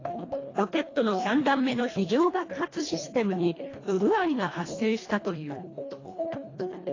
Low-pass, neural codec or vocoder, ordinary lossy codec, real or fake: 7.2 kHz; codec, 24 kHz, 1.5 kbps, HILCodec; AAC, 32 kbps; fake